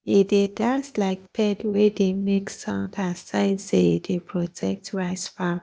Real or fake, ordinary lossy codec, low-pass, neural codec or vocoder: fake; none; none; codec, 16 kHz, 0.8 kbps, ZipCodec